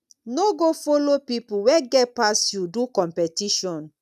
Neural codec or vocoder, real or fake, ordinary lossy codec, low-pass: none; real; none; 14.4 kHz